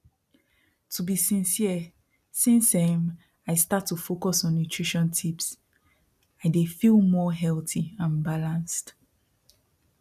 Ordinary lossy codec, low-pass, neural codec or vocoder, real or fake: none; 14.4 kHz; none; real